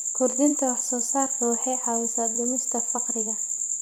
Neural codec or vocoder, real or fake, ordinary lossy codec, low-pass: vocoder, 44.1 kHz, 128 mel bands every 256 samples, BigVGAN v2; fake; none; none